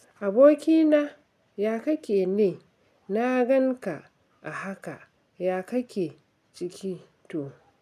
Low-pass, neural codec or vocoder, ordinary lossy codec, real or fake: 14.4 kHz; none; none; real